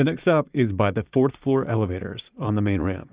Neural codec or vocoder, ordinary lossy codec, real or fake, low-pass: vocoder, 44.1 kHz, 80 mel bands, Vocos; Opus, 64 kbps; fake; 3.6 kHz